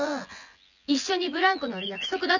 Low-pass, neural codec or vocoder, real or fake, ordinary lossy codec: 7.2 kHz; vocoder, 24 kHz, 100 mel bands, Vocos; fake; none